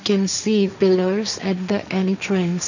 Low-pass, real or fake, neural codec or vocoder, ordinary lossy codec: 7.2 kHz; fake; codec, 16 kHz, 1.1 kbps, Voila-Tokenizer; none